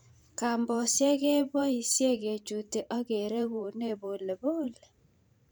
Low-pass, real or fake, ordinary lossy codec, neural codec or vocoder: none; fake; none; vocoder, 44.1 kHz, 128 mel bands every 512 samples, BigVGAN v2